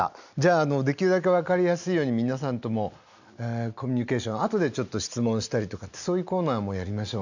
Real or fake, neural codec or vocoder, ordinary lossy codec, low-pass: real; none; none; 7.2 kHz